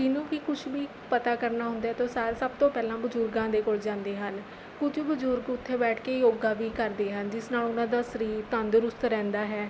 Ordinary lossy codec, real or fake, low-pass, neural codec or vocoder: none; real; none; none